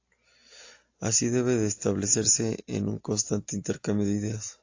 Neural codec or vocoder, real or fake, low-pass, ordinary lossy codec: none; real; 7.2 kHz; AAC, 48 kbps